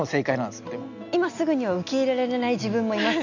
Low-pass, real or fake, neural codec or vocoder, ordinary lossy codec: 7.2 kHz; real; none; none